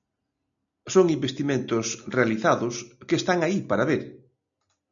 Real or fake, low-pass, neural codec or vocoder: real; 7.2 kHz; none